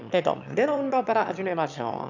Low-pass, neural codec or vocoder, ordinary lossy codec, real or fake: 7.2 kHz; autoencoder, 22.05 kHz, a latent of 192 numbers a frame, VITS, trained on one speaker; none; fake